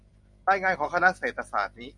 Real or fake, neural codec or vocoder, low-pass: real; none; 10.8 kHz